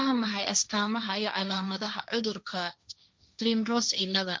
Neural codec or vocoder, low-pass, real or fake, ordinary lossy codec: codec, 16 kHz, 1.1 kbps, Voila-Tokenizer; none; fake; none